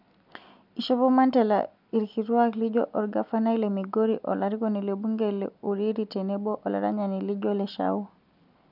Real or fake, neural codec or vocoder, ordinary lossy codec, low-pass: real; none; none; 5.4 kHz